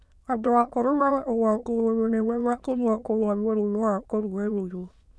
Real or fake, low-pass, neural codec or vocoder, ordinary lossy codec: fake; none; autoencoder, 22.05 kHz, a latent of 192 numbers a frame, VITS, trained on many speakers; none